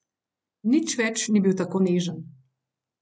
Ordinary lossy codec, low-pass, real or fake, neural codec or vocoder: none; none; real; none